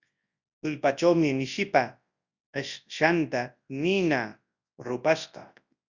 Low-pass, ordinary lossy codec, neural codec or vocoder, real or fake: 7.2 kHz; Opus, 64 kbps; codec, 24 kHz, 0.9 kbps, WavTokenizer, large speech release; fake